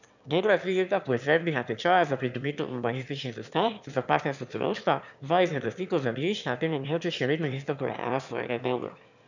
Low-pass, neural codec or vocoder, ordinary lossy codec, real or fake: 7.2 kHz; autoencoder, 22.05 kHz, a latent of 192 numbers a frame, VITS, trained on one speaker; none; fake